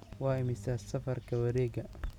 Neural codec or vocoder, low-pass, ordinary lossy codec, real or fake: none; 19.8 kHz; none; real